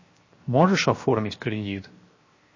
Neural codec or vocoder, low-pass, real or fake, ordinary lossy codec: codec, 16 kHz, 0.7 kbps, FocalCodec; 7.2 kHz; fake; MP3, 32 kbps